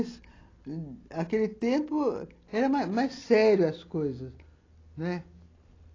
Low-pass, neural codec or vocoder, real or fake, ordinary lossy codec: 7.2 kHz; none; real; AAC, 32 kbps